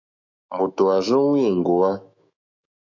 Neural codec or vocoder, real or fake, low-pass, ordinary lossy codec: autoencoder, 48 kHz, 128 numbers a frame, DAC-VAE, trained on Japanese speech; fake; 7.2 kHz; AAC, 48 kbps